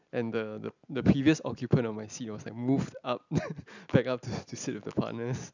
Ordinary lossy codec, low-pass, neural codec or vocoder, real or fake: none; 7.2 kHz; none; real